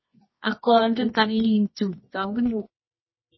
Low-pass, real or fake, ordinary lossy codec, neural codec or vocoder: 7.2 kHz; fake; MP3, 24 kbps; codec, 24 kHz, 0.9 kbps, WavTokenizer, medium music audio release